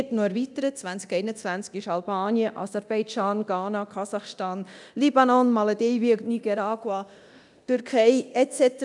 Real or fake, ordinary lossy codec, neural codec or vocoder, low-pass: fake; none; codec, 24 kHz, 0.9 kbps, DualCodec; 10.8 kHz